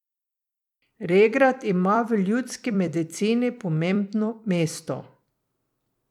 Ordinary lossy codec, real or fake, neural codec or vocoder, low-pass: none; fake; vocoder, 44.1 kHz, 128 mel bands every 256 samples, BigVGAN v2; 19.8 kHz